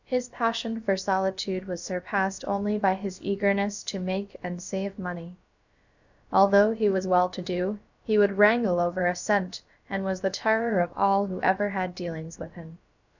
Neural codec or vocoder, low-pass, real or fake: codec, 16 kHz, about 1 kbps, DyCAST, with the encoder's durations; 7.2 kHz; fake